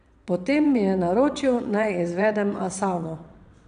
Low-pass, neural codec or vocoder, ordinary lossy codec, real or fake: 9.9 kHz; vocoder, 22.05 kHz, 80 mel bands, WaveNeXt; none; fake